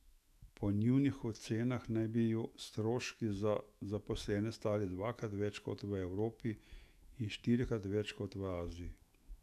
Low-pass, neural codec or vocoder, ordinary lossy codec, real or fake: 14.4 kHz; autoencoder, 48 kHz, 128 numbers a frame, DAC-VAE, trained on Japanese speech; none; fake